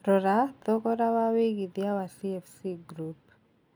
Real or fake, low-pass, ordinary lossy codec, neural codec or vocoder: real; none; none; none